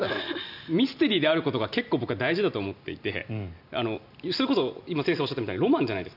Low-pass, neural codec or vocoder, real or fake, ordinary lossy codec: 5.4 kHz; none; real; none